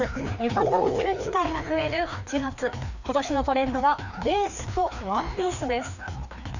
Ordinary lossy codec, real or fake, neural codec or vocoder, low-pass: none; fake; codec, 16 kHz, 2 kbps, FreqCodec, larger model; 7.2 kHz